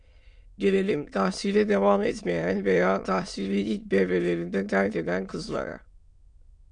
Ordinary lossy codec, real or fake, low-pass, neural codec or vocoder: MP3, 96 kbps; fake; 9.9 kHz; autoencoder, 22.05 kHz, a latent of 192 numbers a frame, VITS, trained on many speakers